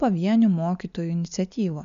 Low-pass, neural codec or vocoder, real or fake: 7.2 kHz; none; real